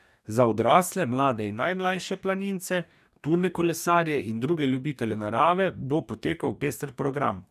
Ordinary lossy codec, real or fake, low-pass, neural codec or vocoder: none; fake; 14.4 kHz; codec, 44.1 kHz, 2.6 kbps, DAC